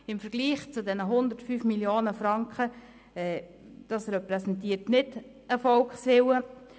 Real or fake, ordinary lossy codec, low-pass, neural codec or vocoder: real; none; none; none